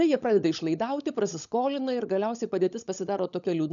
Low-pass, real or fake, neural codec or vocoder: 7.2 kHz; fake; codec, 16 kHz, 16 kbps, FunCodec, trained on LibriTTS, 50 frames a second